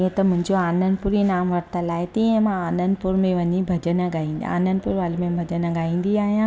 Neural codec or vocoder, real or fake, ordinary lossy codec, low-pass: none; real; none; none